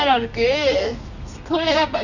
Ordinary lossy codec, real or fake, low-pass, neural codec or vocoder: none; fake; 7.2 kHz; codec, 32 kHz, 1.9 kbps, SNAC